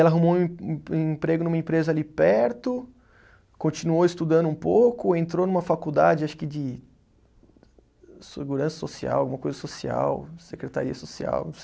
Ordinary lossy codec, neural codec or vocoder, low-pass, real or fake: none; none; none; real